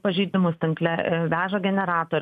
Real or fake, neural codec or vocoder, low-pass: real; none; 14.4 kHz